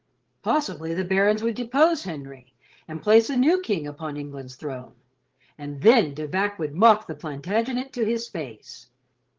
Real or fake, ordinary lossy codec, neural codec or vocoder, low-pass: fake; Opus, 16 kbps; codec, 16 kHz, 8 kbps, FreqCodec, larger model; 7.2 kHz